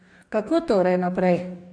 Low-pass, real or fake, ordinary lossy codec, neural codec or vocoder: 9.9 kHz; fake; AAC, 64 kbps; codec, 44.1 kHz, 2.6 kbps, DAC